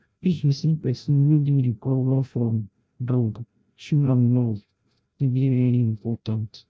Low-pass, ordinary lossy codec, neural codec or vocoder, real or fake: none; none; codec, 16 kHz, 0.5 kbps, FreqCodec, larger model; fake